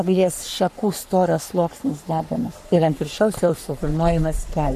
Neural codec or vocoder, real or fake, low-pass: codec, 44.1 kHz, 3.4 kbps, Pupu-Codec; fake; 14.4 kHz